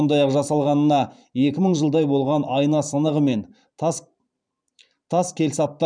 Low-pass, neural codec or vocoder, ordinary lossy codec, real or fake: 9.9 kHz; vocoder, 44.1 kHz, 128 mel bands every 512 samples, BigVGAN v2; none; fake